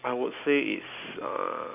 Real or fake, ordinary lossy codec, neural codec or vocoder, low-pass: real; none; none; 3.6 kHz